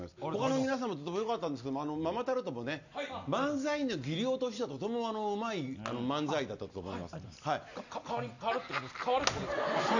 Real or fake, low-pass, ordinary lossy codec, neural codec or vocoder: real; 7.2 kHz; none; none